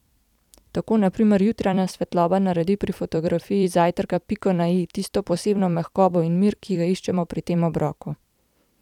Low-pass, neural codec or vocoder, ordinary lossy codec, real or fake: 19.8 kHz; vocoder, 44.1 kHz, 128 mel bands every 256 samples, BigVGAN v2; none; fake